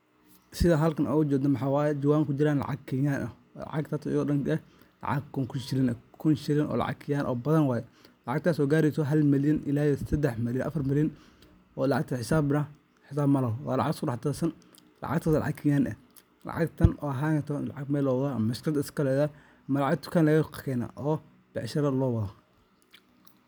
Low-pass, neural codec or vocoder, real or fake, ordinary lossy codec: none; none; real; none